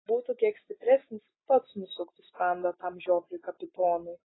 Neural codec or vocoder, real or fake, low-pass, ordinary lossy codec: none; real; 7.2 kHz; AAC, 16 kbps